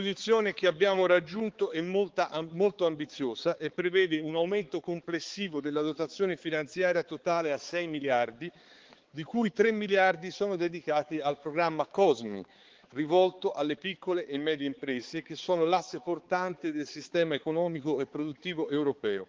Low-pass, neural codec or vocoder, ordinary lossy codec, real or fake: 7.2 kHz; codec, 16 kHz, 4 kbps, X-Codec, HuBERT features, trained on balanced general audio; Opus, 16 kbps; fake